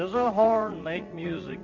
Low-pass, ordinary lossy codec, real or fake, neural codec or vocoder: 7.2 kHz; MP3, 64 kbps; fake; vocoder, 44.1 kHz, 80 mel bands, Vocos